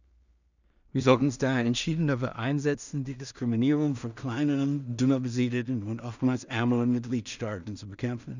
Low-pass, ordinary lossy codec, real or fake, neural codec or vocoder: 7.2 kHz; none; fake; codec, 16 kHz in and 24 kHz out, 0.4 kbps, LongCat-Audio-Codec, two codebook decoder